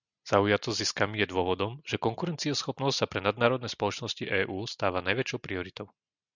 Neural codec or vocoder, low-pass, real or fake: none; 7.2 kHz; real